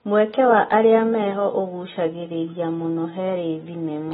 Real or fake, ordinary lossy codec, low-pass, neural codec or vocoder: real; AAC, 16 kbps; 14.4 kHz; none